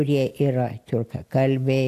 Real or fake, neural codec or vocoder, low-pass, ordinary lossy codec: real; none; 14.4 kHz; AAC, 64 kbps